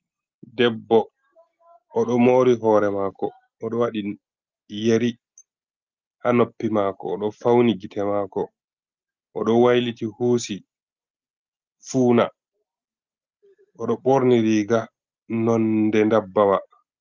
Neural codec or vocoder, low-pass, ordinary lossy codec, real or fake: none; 7.2 kHz; Opus, 24 kbps; real